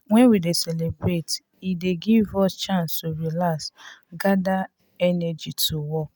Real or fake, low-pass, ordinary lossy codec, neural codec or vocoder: real; none; none; none